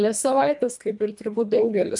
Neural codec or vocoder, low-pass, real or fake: codec, 24 kHz, 1.5 kbps, HILCodec; 10.8 kHz; fake